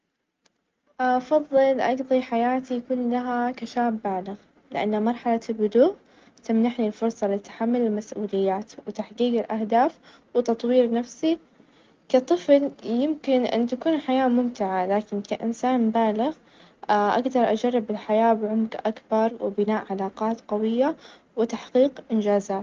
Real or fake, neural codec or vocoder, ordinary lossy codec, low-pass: real; none; Opus, 24 kbps; 7.2 kHz